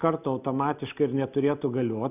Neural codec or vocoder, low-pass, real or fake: none; 3.6 kHz; real